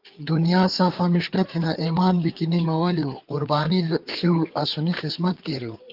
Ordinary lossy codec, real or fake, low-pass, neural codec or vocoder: Opus, 32 kbps; fake; 5.4 kHz; codec, 16 kHz, 4 kbps, FreqCodec, larger model